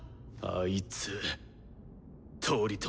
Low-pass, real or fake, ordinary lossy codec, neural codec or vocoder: none; real; none; none